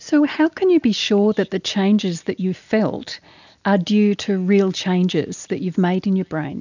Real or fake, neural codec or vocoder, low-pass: real; none; 7.2 kHz